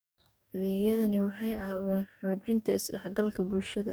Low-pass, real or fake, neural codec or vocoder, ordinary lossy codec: none; fake; codec, 44.1 kHz, 2.6 kbps, DAC; none